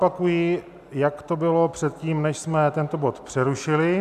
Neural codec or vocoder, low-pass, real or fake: none; 14.4 kHz; real